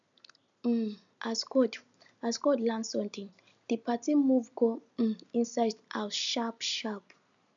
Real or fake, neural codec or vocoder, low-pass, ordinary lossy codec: real; none; 7.2 kHz; none